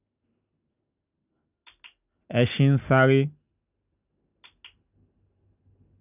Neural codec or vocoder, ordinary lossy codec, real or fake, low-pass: none; none; real; 3.6 kHz